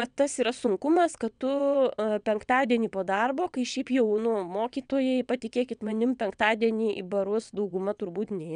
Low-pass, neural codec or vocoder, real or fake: 9.9 kHz; vocoder, 22.05 kHz, 80 mel bands, WaveNeXt; fake